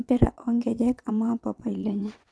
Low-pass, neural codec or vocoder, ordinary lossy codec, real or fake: none; vocoder, 22.05 kHz, 80 mel bands, WaveNeXt; none; fake